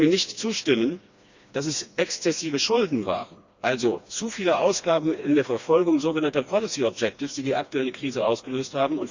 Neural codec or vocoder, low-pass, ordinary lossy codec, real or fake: codec, 16 kHz, 2 kbps, FreqCodec, smaller model; 7.2 kHz; Opus, 64 kbps; fake